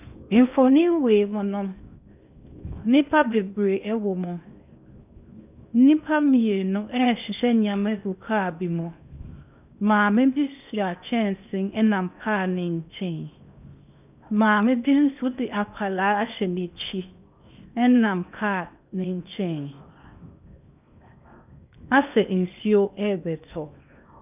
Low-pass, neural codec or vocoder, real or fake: 3.6 kHz; codec, 16 kHz in and 24 kHz out, 0.8 kbps, FocalCodec, streaming, 65536 codes; fake